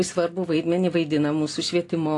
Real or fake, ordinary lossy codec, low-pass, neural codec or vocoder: real; AAC, 48 kbps; 10.8 kHz; none